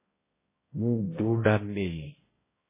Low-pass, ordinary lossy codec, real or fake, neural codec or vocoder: 3.6 kHz; MP3, 16 kbps; fake; codec, 16 kHz, 0.5 kbps, X-Codec, HuBERT features, trained on balanced general audio